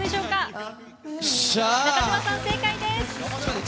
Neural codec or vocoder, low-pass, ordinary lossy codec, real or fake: none; none; none; real